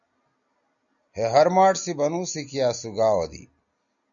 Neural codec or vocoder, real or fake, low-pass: none; real; 7.2 kHz